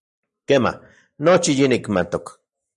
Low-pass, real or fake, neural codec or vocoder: 10.8 kHz; real; none